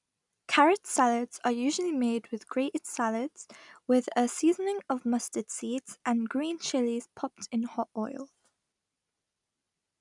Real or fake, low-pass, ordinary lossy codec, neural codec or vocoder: real; 10.8 kHz; none; none